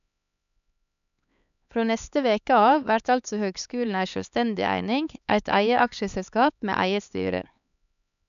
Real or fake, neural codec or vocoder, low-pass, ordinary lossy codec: fake; codec, 16 kHz, 4 kbps, X-Codec, HuBERT features, trained on LibriSpeech; 7.2 kHz; none